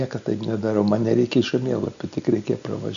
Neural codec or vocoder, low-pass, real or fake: none; 7.2 kHz; real